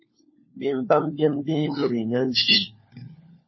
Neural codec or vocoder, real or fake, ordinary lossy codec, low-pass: codec, 16 kHz, 4 kbps, FunCodec, trained on LibriTTS, 50 frames a second; fake; MP3, 24 kbps; 7.2 kHz